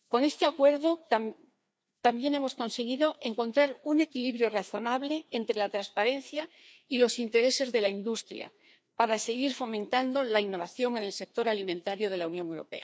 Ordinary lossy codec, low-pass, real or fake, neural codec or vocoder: none; none; fake; codec, 16 kHz, 2 kbps, FreqCodec, larger model